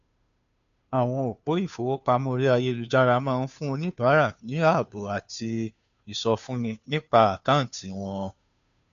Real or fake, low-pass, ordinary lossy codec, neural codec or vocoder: fake; 7.2 kHz; none; codec, 16 kHz, 2 kbps, FunCodec, trained on Chinese and English, 25 frames a second